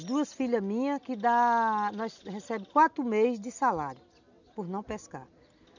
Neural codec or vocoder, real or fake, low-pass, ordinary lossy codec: none; real; 7.2 kHz; none